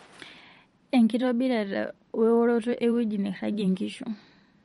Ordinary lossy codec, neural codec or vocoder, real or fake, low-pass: MP3, 48 kbps; vocoder, 44.1 kHz, 128 mel bands every 512 samples, BigVGAN v2; fake; 19.8 kHz